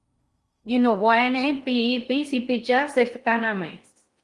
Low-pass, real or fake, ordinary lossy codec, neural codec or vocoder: 10.8 kHz; fake; Opus, 32 kbps; codec, 16 kHz in and 24 kHz out, 0.6 kbps, FocalCodec, streaming, 4096 codes